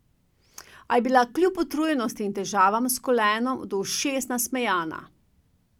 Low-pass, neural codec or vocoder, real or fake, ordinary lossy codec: 19.8 kHz; none; real; none